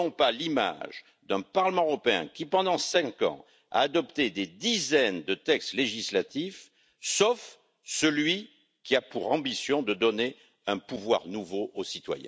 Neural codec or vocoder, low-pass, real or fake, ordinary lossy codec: none; none; real; none